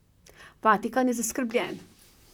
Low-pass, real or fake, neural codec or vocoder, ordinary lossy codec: 19.8 kHz; fake; vocoder, 44.1 kHz, 128 mel bands, Pupu-Vocoder; none